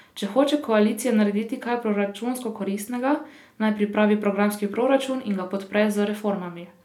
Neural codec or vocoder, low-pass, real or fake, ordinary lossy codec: none; 19.8 kHz; real; none